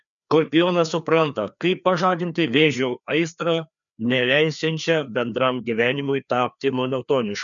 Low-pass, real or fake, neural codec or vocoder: 7.2 kHz; fake; codec, 16 kHz, 2 kbps, FreqCodec, larger model